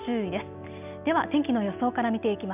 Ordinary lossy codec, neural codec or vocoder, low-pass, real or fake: none; none; 3.6 kHz; real